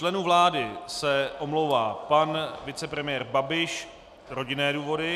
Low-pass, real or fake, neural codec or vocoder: 14.4 kHz; real; none